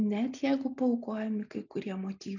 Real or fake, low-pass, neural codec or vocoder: real; 7.2 kHz; none